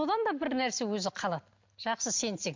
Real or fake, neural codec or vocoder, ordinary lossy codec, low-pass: real; none; MP3, 64 kbps; 7.2 kHz